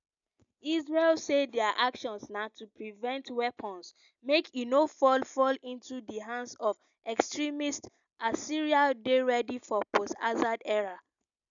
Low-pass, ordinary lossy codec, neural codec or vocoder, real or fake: 7.2 kHz; none; none; real